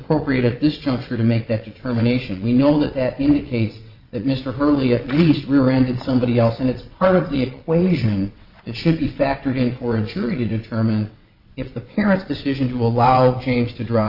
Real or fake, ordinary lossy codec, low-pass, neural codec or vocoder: fake; Opus, 64 kbps; 5.4 kHz; vocoder, 22.05 kHz, 80 mel bands, WaveNeXt